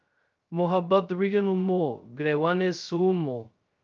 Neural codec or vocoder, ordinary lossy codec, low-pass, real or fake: codec, 16 kHz, 0.2 kbps, FocalCodec; Opus, 24 kbps; 7.2 kHz; fake